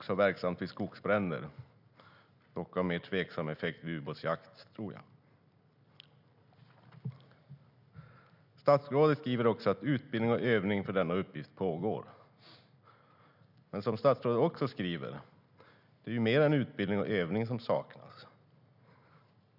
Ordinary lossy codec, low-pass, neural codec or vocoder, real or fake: none; 5.4 kHz; none; real